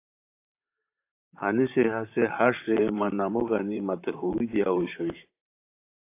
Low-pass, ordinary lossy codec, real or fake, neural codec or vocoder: 3.6 kHz; AAC, 24 kbps; fake; vocoder, 44.1 kHz, 128 mel bands, Pupu-Vocoder